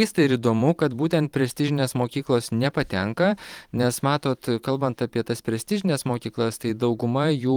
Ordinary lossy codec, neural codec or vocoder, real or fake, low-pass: Opus, 24 kbps; vocoder, 44.1 kHz, 128 mel bands every 512 samples, BigVGAN v2; fake; 19.8 kHz